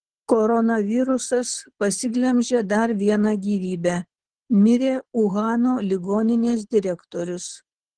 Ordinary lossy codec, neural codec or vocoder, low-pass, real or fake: Opus, 16 kbps; codec, 24 kHz, 6 kbps, HILCodec; 9.9 kHz; fake